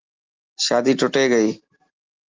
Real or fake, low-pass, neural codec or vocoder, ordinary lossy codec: real; 7.2 kHz; none; Opus, 32 kbps